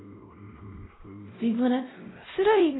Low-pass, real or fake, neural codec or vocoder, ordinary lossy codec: 7.2 kHz; fake; codec, 16 kHz, 0.5 kbps, X-Codec, WavLM features, trained on Multilingual LibriSpeech; AAC, 16 kbps